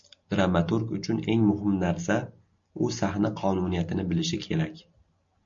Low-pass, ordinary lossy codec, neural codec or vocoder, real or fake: 7.2 kHz; MP3, 48 kbps; none; real